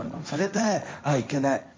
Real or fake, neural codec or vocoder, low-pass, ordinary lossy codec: fake; codec, 16 kHz, 1.1 kbps, Voila-Tokenizer; none; none